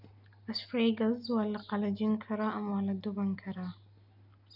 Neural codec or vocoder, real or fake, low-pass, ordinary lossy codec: none; real; 5.4 kHz; none